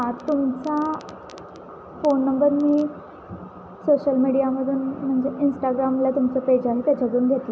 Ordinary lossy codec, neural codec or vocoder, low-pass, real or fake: none; none; none; real